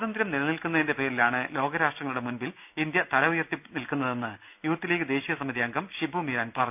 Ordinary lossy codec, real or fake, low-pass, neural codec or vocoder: none; real; 3.6 kHz; none